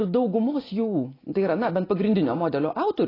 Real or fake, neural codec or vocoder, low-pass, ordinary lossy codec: real; none; 5.4 kHz; AAC, 24 kbps